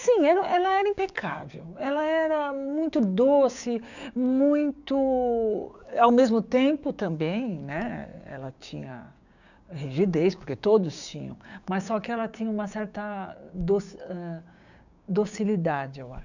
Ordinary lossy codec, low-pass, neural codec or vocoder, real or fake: none; 7.2 kHz; codec, 16 kHz, 6 kbps, DAC; fake